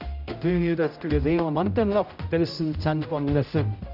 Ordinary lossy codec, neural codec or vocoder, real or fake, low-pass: none; codec, 16 kHz, 0.5 kbps, X-Codec, HuBERT features, trained on general audio; fake; 5.4 kHz